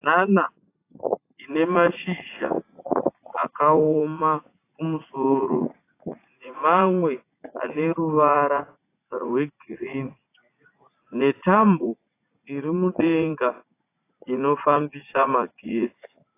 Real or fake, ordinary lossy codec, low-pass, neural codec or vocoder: fake; AAC, 24 kbps; 3.6 kHz; vocoder, 44.1 kHz, 80 mel bands, Vocos